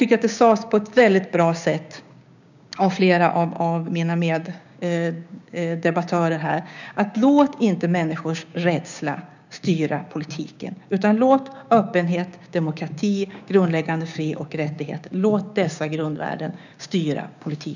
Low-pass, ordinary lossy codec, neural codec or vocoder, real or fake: 7.2 kHz; none; codec, 16 kHz, 6 kbps, DAC; fake